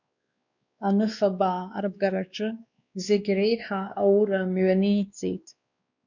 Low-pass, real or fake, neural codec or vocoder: 7.2 kHz; fake; codec, 16 kHz, 2 kbps, X-Codec, WavLM features, trained on Multilingual LibriSpeech